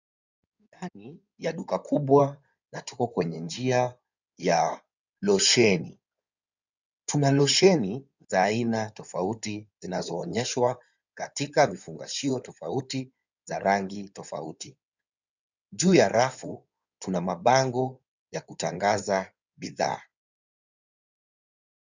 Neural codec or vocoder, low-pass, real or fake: codec, 16 kHz in and 24 kHz out, 2.2 kbps, FireRedTTS-2 codec; 7.2 kHz; fake